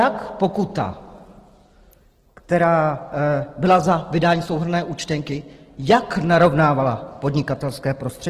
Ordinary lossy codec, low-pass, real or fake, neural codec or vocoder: Opus, 16 kbps; 14.4 kHz; real; none